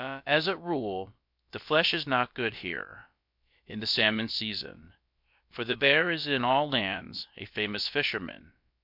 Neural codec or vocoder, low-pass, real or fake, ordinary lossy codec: codec, 16 kHz, about 1 kbps, DyCAST, with the encoder's durations; 5.4 kHz; fake; MP3, 48 kbps